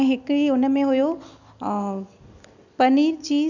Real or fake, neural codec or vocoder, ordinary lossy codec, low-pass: real; none; none; 7.2 kHz